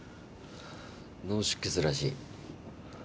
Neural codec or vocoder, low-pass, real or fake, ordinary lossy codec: none; none; real; none